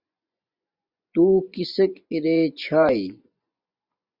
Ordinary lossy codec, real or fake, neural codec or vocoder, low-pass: Opus, 64 kbps; fake; vocoder, 24 kHz, 100 mel bands, Vocos; 5.4 kHz